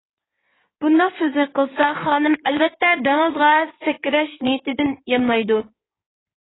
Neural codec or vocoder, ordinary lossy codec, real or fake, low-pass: codec, 16 kHz in and 24 kHz out, 2.2 kbps, FireRedTTS-2 codec; AAC, 16 kbps; fake; 7.2 kHz